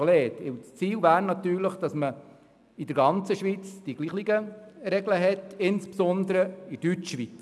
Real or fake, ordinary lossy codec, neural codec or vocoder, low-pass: real; none; none; none